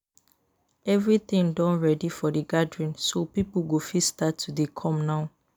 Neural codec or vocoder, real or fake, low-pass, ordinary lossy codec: none; real; none; none